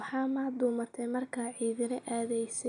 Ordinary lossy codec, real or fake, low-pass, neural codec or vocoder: none; real; 9.9 kHz; none